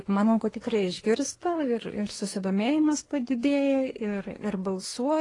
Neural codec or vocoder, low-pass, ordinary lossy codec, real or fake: codec, 24 kHz, 1 kbps, SNAC; 10.8 kHz; AAC, 32 kbps; fake